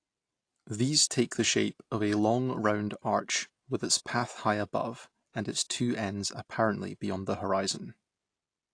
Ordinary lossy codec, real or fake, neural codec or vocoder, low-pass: AAC, 48 kbps; real; none; 9.9 kHz